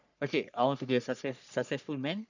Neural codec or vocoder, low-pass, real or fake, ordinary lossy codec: codec, 44.1 kHz, 3.4 kbps, Pupu-Codec; 7.2 kHz; fake; none